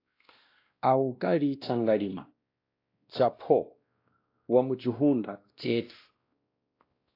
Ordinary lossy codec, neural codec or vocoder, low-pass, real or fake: AAC, 32 kbps; codec, 16 kHz, 1 kbps, X-Codec, WavLM features, trained on Multilingual LibriSpeech; 5.4 kHz; fake